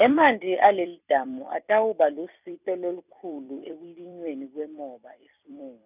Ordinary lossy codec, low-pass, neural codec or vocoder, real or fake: none; 3.6 kHz; vocoder, 44.1 kHz, 128 mel bands every 256 samples, BigVGAN v2; fake